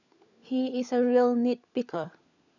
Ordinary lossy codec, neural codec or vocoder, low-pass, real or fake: none; codec, 16 kHz, 16 kbps, FunCodec, trained on LibriTTS, 50 frames a second; 7.2 kHz; fake